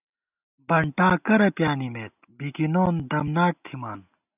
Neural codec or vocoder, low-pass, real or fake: none; 3.6 kHz; real